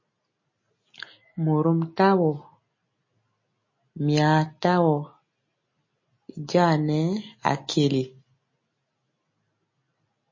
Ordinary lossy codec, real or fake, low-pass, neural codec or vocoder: MP3, 32 kbps; real; 7.2 kHz; none